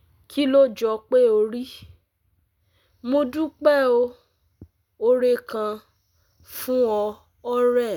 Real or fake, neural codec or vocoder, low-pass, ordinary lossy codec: real; none; 19.8 kHz; none